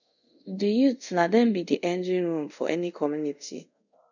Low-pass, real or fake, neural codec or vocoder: 7.2 kHz; fake; codec, 24 kHz, 0.5 kbps, DualCodec